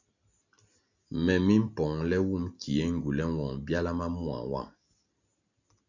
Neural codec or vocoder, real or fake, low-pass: none; real; 7.2 kHz